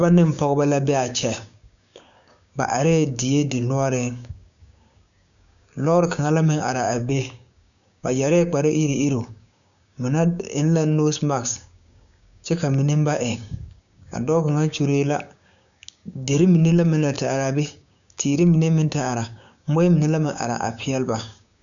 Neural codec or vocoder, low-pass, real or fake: codec, 16 kHz, 6 kbps, DAC; 7.2 kHz; fake